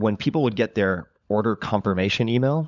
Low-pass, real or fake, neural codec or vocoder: 7.2 kHz; fake; codec, 16 kHz, 16 kbps, FunCodec, trained on LibriTTS, 50 frames a second